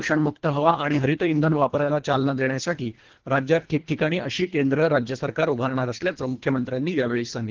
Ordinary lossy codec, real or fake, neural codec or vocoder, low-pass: Opus, 16 kbps; fake; codec, 24 kHz, 1.5 kbps, HILCodec; 7.2 kHz